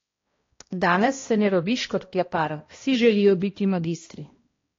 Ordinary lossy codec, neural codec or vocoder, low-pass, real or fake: AAC, 32 kbps; codec, 16 kHz, 1 kbps, X-Codec, HuBERT features, trained on balanced general audio; 7.2 kHz; fake